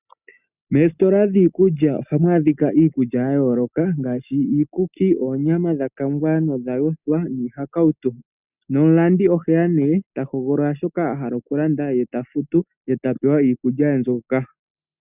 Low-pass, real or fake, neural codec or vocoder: 3.6 kHz; real; none